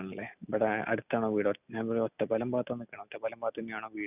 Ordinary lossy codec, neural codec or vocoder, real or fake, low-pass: none; none; real; 3.6 kHz